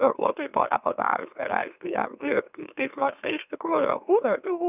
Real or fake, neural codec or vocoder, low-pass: fake; autoencoder, 44.1 kHz, a latent of 192 numbers a frame, MeloTTS; 3.6 kHz